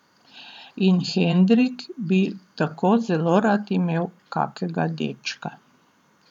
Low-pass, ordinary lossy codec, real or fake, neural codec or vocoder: 19.8 kHz; none; fake; vocoder, 44.1 kHz, 128 mel bands every 512 samples, BigVGAN v2